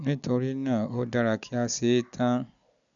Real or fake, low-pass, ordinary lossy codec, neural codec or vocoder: real; 7.2 kHz; none; none